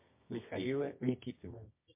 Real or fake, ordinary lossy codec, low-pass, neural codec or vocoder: fake; MP3, 24 kbps; 3.6 kHz; codec, 24 kHz, 0.9 kbps, WavTokenizer, medium music audio release